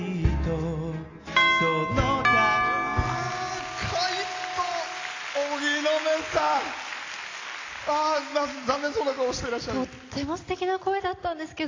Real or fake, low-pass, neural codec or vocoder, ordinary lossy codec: real; 7.2 kHz; none; AAC, 32 kbps